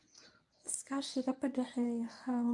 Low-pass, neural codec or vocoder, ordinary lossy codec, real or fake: 10.8 kHz; codec, 24 kHz, 0.9 kbps, WavTokenizer, medium speech release version 1; AAC, 64 kbps; fake